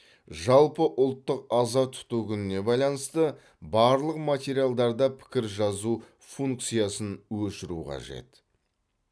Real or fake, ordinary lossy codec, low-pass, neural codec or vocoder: real; none; none; none